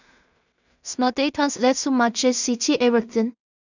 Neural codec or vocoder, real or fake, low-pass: codec, 16 kHz in and 24 kHz out, 0.4 kbps, LongCat-Audio-Codec, two codebook decoder; fake; 7.2 kHz